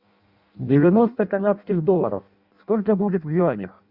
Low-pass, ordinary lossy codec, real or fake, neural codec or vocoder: 5.4 kHz; Opus, 64 kbps; fake; codec, 16 kHz in and 24 kHz out, 0.6 kbps, FireRedTTS-2 codec